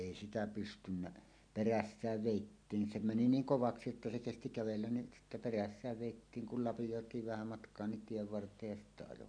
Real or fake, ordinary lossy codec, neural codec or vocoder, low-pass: real; none; none; 9.9 kHz